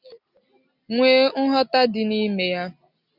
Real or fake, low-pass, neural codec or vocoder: real; 5.4 kHz; none